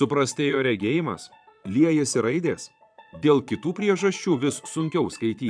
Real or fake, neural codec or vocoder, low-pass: fake; vocoder, 22.05 kHz, 80 mel bands, Vocos; 9.9 kHz